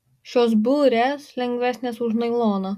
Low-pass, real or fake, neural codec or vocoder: 14.4 kHz; real; none